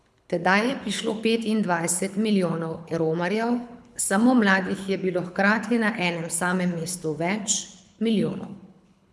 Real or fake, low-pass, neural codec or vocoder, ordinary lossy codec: fake; none; codec, 24 kHz, 6 kbps, HILCodec; none